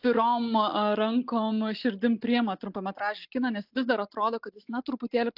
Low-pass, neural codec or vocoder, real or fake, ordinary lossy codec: 5.4 kHz; none; real; AAC, 48 kbps